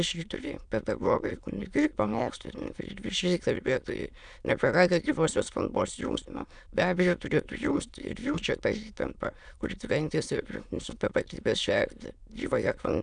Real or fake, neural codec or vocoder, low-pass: fake; autoencoder, 22.05 kHz, a latent of 192 numbers a frame, VITS, trained on many speakers; 9.9 kHz